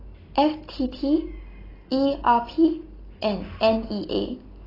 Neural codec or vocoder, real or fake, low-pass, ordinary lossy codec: none; real; 5.4 kHz; AAC, 24 kbps